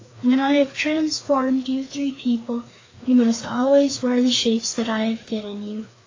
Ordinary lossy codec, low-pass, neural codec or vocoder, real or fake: AAC, 32 kbps; 7.2 kHz; codec, 16 kHz, 2 kbps, FreqCodec, larger model; fake